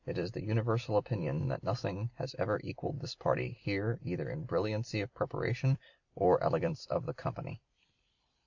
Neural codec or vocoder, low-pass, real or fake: vocoder, 44.1 kHz, 128 mel bands every 256 samples, BigVGAN v2; 7.2 kHz; fake